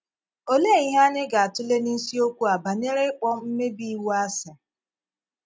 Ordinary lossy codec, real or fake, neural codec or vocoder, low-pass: none; real; none; none